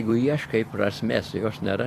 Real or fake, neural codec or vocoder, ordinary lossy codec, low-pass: fake; vocoder, 44.1 kHz, 128 mel bands every 256 samples, BigVGAN v2; AAC, 64 kbps; 14.4 kHz